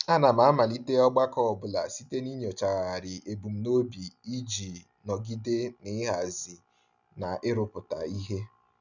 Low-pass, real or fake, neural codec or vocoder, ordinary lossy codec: 7.2 kHz; real; none; none